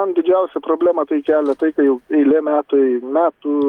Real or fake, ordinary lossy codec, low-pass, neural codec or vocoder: real; Opus, 32 kbps; 19.8 kHz; none